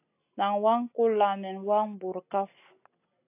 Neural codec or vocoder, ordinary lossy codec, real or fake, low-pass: none; AAC, 24 kbps; real; 3.6 kHz